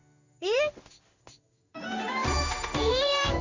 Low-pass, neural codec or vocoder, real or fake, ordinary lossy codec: 7.2 kHz; codec, 16 kHz in and 24 kHz out, 1 kbps, XY-Tokenizer; fake; Opus, 64 kbps